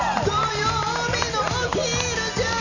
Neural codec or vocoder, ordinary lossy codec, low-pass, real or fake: none; none; 7.2 kHz; real